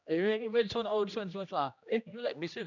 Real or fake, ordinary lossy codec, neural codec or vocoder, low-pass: fake; none; codec, 16 kHz, 1 kbps, X-Codec, HuBERT features, trained on general audio; 7.2 kHz